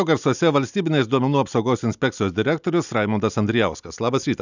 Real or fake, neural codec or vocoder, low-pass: real; none; 7.2 kHz